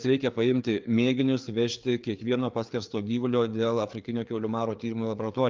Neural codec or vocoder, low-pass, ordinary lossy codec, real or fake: codec, 16 kHz, 4 kbps, FreqCodec, larger model; 7.2 kHz; Opus, 32 kbps; fake